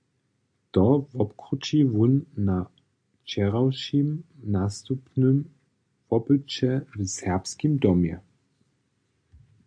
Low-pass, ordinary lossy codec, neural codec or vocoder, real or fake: 9.9 kHz; AAC, 64 kbps; none; real